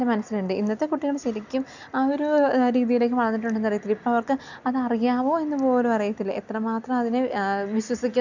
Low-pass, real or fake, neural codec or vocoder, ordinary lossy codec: 7.2 kHz; real; none; none